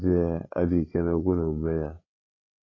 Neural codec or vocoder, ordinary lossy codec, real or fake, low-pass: codec, 16 kHz, 8 kbps, FreqCodec, larger model; AAC, 32 kbps; fake; 7.2 kHz